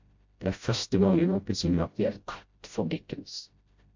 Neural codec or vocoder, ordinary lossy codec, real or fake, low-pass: codec, 16 kHz, 0.5 kbps, FreqCodec, smaller model; MP3, 48 kbps; fake; 7.2 kHz